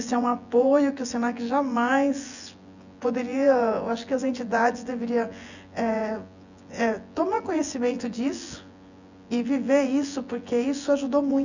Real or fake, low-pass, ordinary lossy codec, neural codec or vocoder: fake; 7.2 kHz; none; vocoder, 24 kHz, 100 mel bands, Vocos